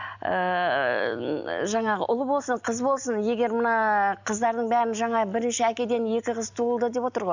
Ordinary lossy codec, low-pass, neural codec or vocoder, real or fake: none; 7.2 kHz; none; real